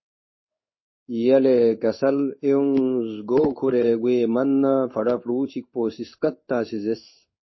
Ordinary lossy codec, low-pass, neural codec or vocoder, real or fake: MP3, 24 kbps; 7.2 kHz; codec, 16 kHz in and 24 kHz out, 1 kbps, XY-Tokenizer; fake